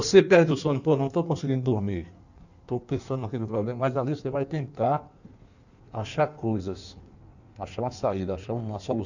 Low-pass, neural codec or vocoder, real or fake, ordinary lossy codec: 7.2 kHz; codec, 16 kHz in and 24 kHz out, 1.1 kbps, FireRedTTS-2 codec; fake; none